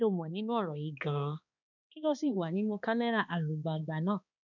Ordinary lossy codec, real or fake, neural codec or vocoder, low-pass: none; fake; codec, 16 kHz, 2 kbps, X-Codec, HuBERT features, trained on balanced general audio; 7.2 kHz